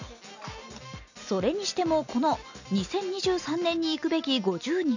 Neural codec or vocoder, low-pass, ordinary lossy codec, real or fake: none; 7.2 kHz; none; real